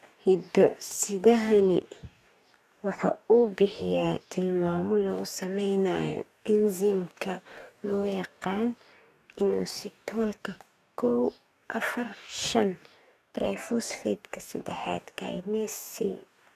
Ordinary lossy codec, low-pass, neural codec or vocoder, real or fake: none; 14.4 kHz; codec, 44.1 kHz, 2.6 kbps, DAC; fake